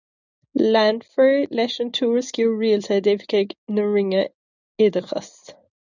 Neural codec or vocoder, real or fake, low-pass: none; real; 7.2 kHz